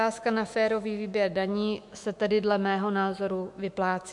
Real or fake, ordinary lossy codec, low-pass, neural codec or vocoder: fake; MP3, 64 kbps; 10.8 kHz; autoencoder, 48 kHz, 128 numbers a frame, DAC-VAE, trained on Japanese speech